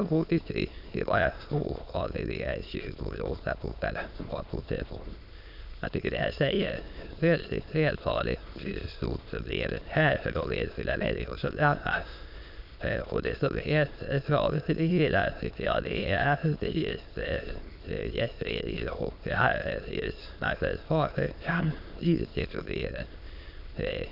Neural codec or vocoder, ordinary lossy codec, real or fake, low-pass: autoencoder, 22.05 kHz, a latent of 192 numbers a frame, VITS, trained on many speakers; none; fake; 5.4 kHz